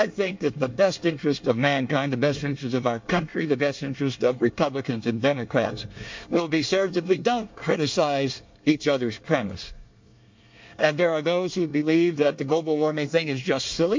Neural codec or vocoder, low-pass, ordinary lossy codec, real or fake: codec, 24 kHz, 1 kbps, SNAC; 7.2 kHz; MP3, 48 kbps; fake